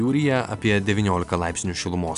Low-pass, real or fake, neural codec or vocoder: 10.8 kHz; real; none